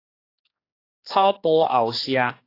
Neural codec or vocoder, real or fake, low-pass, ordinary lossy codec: codec, 16 kHz, 2 kbps, X-Codec, HuBERT features, trained on general audio; fake; 5.4 kHz; AAC, 32 kbps